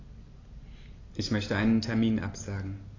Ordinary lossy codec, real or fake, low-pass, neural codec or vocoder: AAC, 32 kbps; real; 7.2 kHz; none